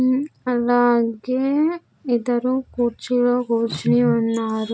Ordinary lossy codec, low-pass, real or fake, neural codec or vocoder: none; none; real; none